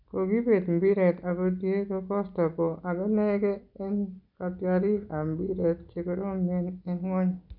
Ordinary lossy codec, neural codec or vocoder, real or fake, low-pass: none; none; real; 5.4 kHz